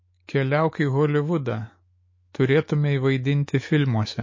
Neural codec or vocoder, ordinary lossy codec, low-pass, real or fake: autoencoder, 48 kHz, 128 numbers a frame, DAC-VAE, trained on Japanese speech; MP3, 32 kbps; 7.2 kHz; fake